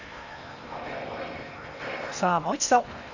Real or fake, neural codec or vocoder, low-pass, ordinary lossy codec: fake; codec, 16 kHz in and 24 kHz out, 0.8 kbps, FocalCodec, streaming, 65536 codes; 7.2 kHz; none